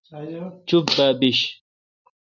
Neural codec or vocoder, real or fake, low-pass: none; real; 7.2 kHz